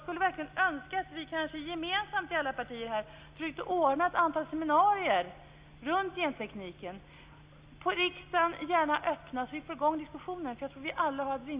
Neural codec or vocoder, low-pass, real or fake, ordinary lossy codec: none; 3.6 kHz; real; Opus, 64 kbps